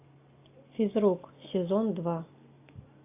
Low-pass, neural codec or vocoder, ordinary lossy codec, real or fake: 3.6 kHz; none; AAC, 24 kbps; real